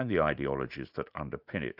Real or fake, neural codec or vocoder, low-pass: fake; vocoder, 44.1 kHz, 80 mel bands, Vocos; 5.4 kHz